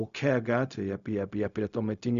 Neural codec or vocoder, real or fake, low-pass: codec, 16 kHz, 0.4 kbps, LongCat-Audio-Codec; fake; 7.2 kHz